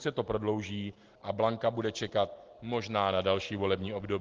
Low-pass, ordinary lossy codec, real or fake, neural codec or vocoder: 7.2 kHz; Opus, 16 kbps; real; none